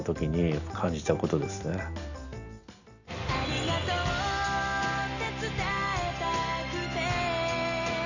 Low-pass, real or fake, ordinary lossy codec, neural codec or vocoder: 7.2 kHz; real; none; none